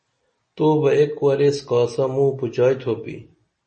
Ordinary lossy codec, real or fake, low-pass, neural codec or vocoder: MP3, 32 kbps; real; 9.9 kHz; none